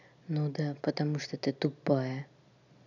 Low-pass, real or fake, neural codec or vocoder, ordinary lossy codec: 7.2 kHz; real; none; none